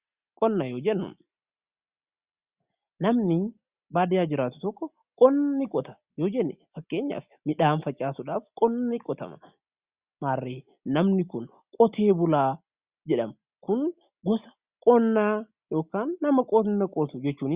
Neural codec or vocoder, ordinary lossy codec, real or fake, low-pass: none; Opus, 64 kbps; real; 3.6 kHz